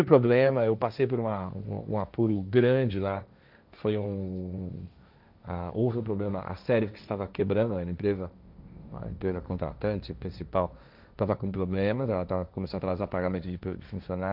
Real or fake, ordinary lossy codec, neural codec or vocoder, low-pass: fake; none; codec, 16 kHz, 1.1 kbps, Voila-Tokenizer; 5.4 kHz